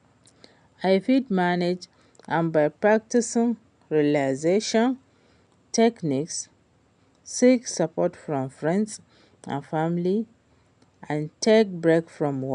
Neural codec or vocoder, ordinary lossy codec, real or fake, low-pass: none; MP3, 96 kbps; real; 9.9 kHz